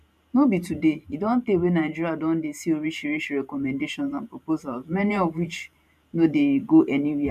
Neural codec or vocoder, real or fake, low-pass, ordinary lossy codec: vocoder, 44.1 kHz, 128 mel bands every 512 samples, BigVGAN v2; fake; 14.4 kHz; none